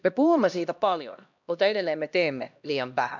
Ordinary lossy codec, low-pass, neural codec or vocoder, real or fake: none; 7.2 kHz; codec, 16 kHz, 1 kbps, X-Codec, HuBERT features, trained on LibriSpeech; fake